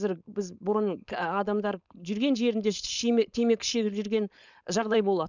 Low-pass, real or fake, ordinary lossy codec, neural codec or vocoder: 7.2 kHz; fake; none; codec, 16 kHz, 4.8 kbps, FACodec